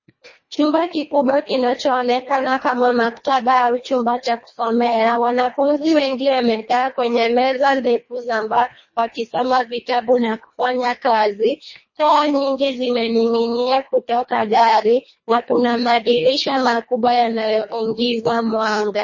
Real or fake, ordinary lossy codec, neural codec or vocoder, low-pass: fake; MP3, 32 kbps; codec, 24 kHz, 1.5 kbps, HILCodec; 7.2 kHz